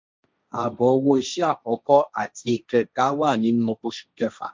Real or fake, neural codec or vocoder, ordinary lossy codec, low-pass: fake; codec, 16 kHz, 1.1 kbps, Voila-Tokenizer; none; none